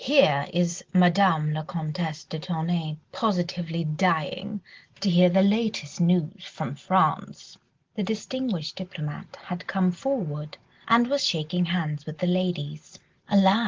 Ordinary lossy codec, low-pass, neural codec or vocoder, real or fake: Opus, 16 kbps; 7.2 kHz; none; real